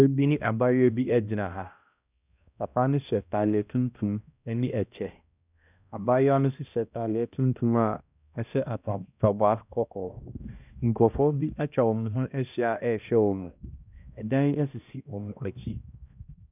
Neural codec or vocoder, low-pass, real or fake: codec, 16 kHz, 1 kbps, X-Codec, HuBERT features, trained on balanced general audio; 3.6 kHz; fake